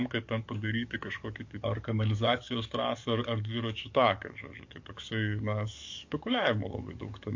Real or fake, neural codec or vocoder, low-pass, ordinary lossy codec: fake; codec, 44.1 kHz, 7.8 kbps, DAC; 7.2 kHz; MP3, 48 kbps